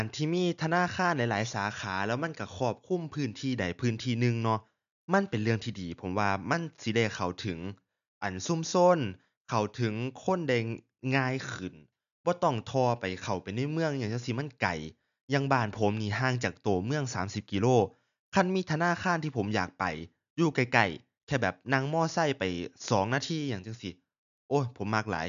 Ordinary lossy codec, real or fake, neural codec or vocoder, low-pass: none; real; none; 7.2 kHz